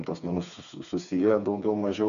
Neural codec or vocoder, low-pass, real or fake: codec, 16 kHz, 4 kbps, FreqCodec, smaller model; 7.2 kHz; fake